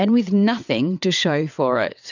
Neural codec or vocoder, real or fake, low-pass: vocoder, 22.05 kHz, 80 mel bands, WaveNeXt; fake; 7.2 kHz